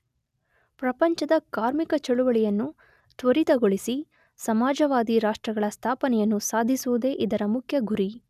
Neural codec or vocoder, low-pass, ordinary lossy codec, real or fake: none; 14.4 kHz; none; real